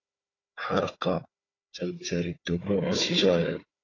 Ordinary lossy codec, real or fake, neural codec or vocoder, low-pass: AAC, 32 kbps; fake; codec, 16 kHz, 4 kbps, FunCodec, trained on Chinese and English, 50 frames a second; 7.2 kHz